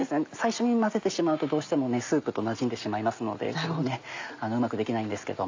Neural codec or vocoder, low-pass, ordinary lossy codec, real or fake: none; 7.2 kHz; AAC, 48 kbps; real